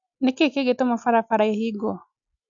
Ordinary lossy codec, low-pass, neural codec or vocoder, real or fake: none; 7.2 kHz; none; real